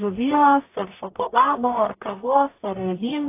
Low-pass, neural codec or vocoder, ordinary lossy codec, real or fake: 3.6 kHz; codec, 44.1 kHz, 0.9 kbps, DAC; AAC, 24 kbps; fake